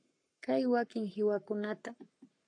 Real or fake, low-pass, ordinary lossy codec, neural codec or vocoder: fake; 9.9 kHz; AAC, 48 kbps; codec, 44.1 kHz, 7.8 kbps, Pupu-Codec